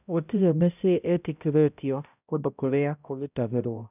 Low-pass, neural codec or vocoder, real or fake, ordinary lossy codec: 3.6 kHz; codec, 16 kHz, 0.5 kbps, X-Codec, HuBERT features, trained on balanced general audio; fake; none